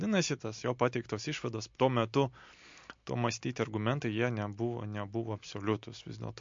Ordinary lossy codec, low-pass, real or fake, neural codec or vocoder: MP3, 48 kbps; 7.2 kHz; real; none